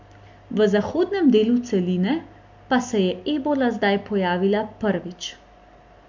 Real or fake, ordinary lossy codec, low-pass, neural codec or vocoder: real; MP3, 64 kbps; 7.2 kHz; none